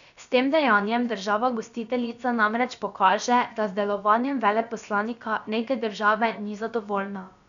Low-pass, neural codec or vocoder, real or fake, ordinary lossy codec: 7.2 kHz; codec, 16 kHz, about 1 kbps, DyCAST, with the encoder's durations; fake; none